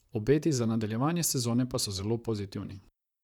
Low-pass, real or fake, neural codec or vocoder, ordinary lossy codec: 19.8 kHz; fake; vocoder, 44.1 kHz, 128 mel bands, Pupu-Vocoder; none